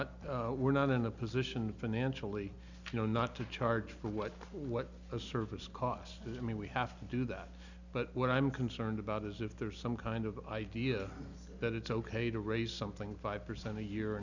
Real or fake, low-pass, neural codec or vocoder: real; 7.2 kHz; none